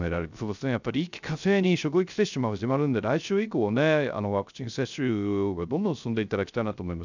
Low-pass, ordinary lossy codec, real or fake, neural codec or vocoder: 7.2 kHz; none; fake; codec, 16 kHz, 0.3 kbps, FocalCodec